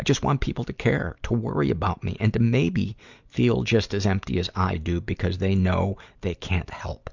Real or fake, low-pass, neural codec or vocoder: real; 7.2 kHz; none